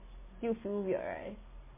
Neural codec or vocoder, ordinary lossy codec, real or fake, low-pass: none; MP3, 16 kbps; real; 3.6 kHz